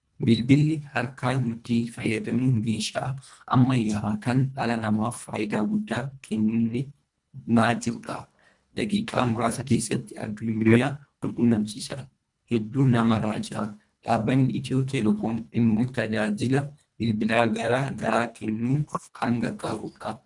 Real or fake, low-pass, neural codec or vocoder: fake; 10.8 kHz; codec, 24 kHz, 1.5 kbps, HILCodec